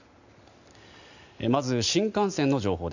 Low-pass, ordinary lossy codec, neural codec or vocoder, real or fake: 7.2 kHz; none; none; real